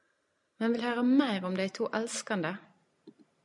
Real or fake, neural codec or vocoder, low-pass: real; none; 10.8 kHz